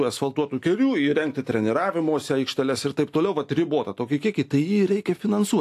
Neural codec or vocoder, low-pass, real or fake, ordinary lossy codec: none; 14.4 kHz; real; AAC, 64 kbps